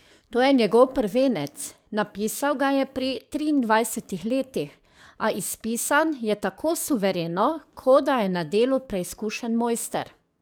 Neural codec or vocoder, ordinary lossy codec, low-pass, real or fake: codec, 44.1 kHz, 7.8 kbps, DAC; none; none; fake